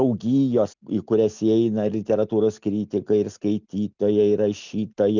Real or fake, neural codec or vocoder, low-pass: real; none; 7.2 kHz